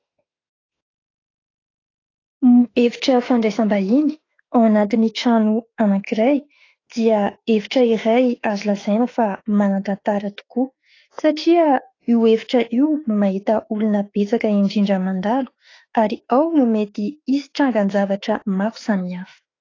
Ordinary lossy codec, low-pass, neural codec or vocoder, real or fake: AAC, 32 kbps; 7.2 kHz; autoencoder, 48 kHz, 32 numbers a frame, DAC-VAE, trained on Japanese speech; fake